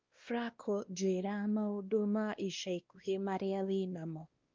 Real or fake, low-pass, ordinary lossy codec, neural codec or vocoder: fake; 7.2 kHz; Opus, 32 kbps; codec, 16 kHz, 1 kbps, X-Codec, WavLM features, trained on Multilingual LibriSpeech